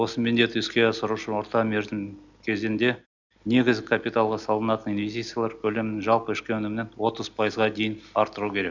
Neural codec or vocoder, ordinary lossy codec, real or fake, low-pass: none; none; real; 7.2 kHz